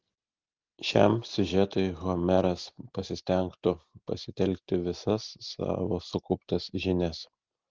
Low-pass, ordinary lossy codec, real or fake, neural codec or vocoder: 7.2 kHz; Opus, 16 kbps; real; none